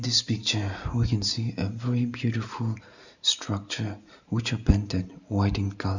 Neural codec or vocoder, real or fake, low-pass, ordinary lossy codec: none; real; 7.2 kHz; none